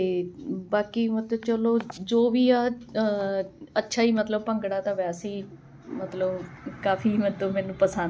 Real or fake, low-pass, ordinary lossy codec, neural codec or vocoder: real; none; none; none